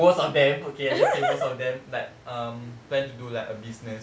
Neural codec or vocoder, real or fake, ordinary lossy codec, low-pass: none; real; none; none